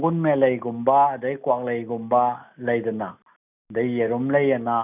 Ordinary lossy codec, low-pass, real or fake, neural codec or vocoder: none; 3.6 kHz; real; none